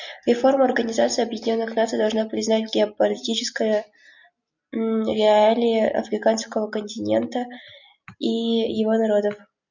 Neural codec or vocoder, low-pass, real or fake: none; 7.2 kHz; real